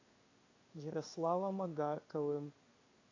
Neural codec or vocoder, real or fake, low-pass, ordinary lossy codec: codec, 16 kHz in and 24 kHz out, 1 kbps, XY-Tokenizer; fake; 7.2 kHz; AAC, 32 kbps